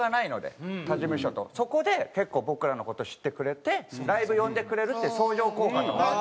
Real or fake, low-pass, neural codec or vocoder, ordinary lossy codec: real; none; none; none